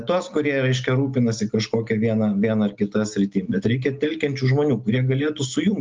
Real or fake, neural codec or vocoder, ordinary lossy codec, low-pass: real; none; Opus, 32 kbps; 7.2 kHz